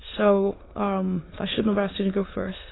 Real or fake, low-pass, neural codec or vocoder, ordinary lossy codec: fake; 7.2 kHz; autoencoder, 22.05 kHz, a latent of 192 numbers a frame, VITS, trained on many speakers; AAC, 16 kbps